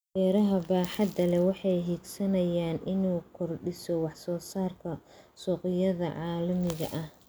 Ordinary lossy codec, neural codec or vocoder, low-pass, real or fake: none; none; none; real